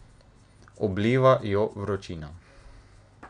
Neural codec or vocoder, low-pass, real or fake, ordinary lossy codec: none; 9.9 kHz; real; none